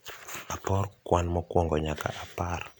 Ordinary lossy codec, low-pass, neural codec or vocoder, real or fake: none; none; none; real